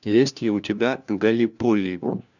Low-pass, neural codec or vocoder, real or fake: 7.2 kHz; codec, 16 kHz, 1 kbps, FunCodec, trained on LibriTTS, 50 frames a second; fake